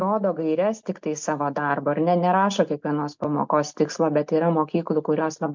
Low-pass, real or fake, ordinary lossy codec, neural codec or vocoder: 7.2 kHz; real; MP3, 64 kbps; none